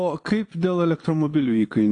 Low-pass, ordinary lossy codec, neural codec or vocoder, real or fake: 9.9 kHz; AAC, 48 kbps; vocoder, 22.05 kHz, 80 mel bands, Vocos; fake